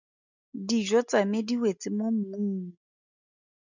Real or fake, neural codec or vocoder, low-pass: real; none; 7.2 kHz